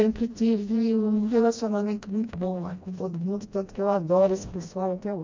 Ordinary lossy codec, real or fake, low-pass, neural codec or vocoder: MP3, 48 kbps; fake; 7.2 kHz; codec, 16 kHz, 1 kbps, FreqCodec, smaller model